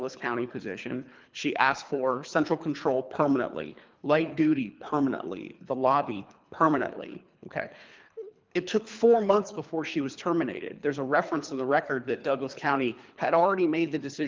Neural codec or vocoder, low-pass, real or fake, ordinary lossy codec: codec, 24 kHz, 3 kbps, HILCodec; 7.2 kHz; fake; Opus, 24 kbps